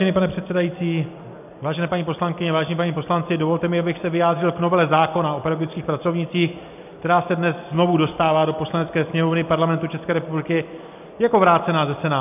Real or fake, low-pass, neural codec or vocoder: real; 3.6 kHz; none